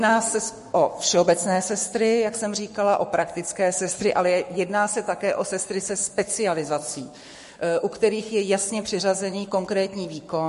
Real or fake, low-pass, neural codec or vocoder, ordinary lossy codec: fake; 14.4 kHz; codec, 44.1 kHz, 7.8 kbps, Pupu-Codec; MP3, 48 kbps